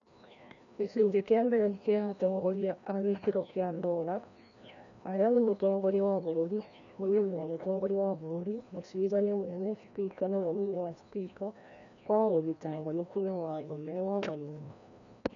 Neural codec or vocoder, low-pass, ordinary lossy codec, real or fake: codec, 16 kHz, 1 kbps, FreqCodec, larger model; 7.2 kHz; none; fake